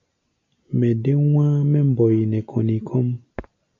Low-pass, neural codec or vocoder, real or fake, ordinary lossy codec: 7.2 kHz; none; real; AAC, 48 kbps